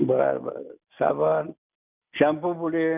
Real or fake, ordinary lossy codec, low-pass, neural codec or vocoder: real; none; 3.6 kHz; none